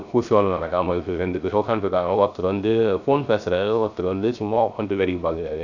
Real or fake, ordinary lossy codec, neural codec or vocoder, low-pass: fake; none; codec, 16 kHz, 0.3 kbps, FocalCodec; 7.2 kHz